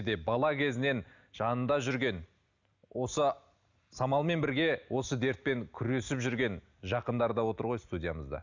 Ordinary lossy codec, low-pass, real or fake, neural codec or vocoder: none; 7.2 kHz; real; none